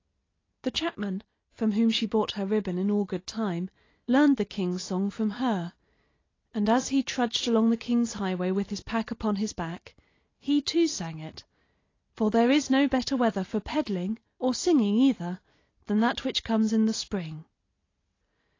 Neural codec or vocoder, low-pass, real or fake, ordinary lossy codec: none; 7.2 kHz; real; AAC, 32 kbps